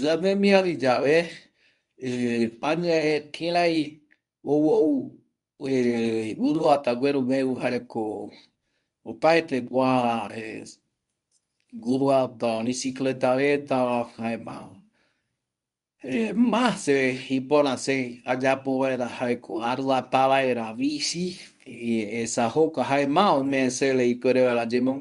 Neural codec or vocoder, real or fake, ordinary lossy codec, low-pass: codec, 24 kHz, 0.9 kbps, WavTokenizer, medium speech release version 1; fake; none; 10.8 kHz